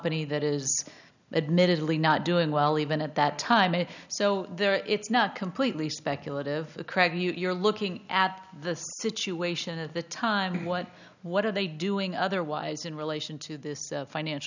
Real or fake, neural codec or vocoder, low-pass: real; none; 7.2 kHz